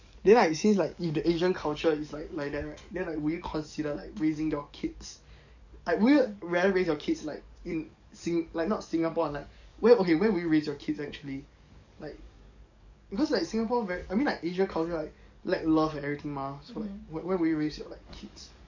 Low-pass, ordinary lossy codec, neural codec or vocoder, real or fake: 7.2 kHz; none; codec, 44.1 kHz, 7.8 kbps, DAC; fake